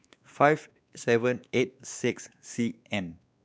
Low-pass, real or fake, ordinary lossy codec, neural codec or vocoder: none; fake; none; codec, 16 kHz, 2 kbps, X-Codec, WavLM features, trained on Multilingual LibriSpeech